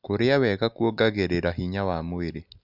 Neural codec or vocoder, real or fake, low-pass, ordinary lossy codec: none; real; 5.4 kHz; none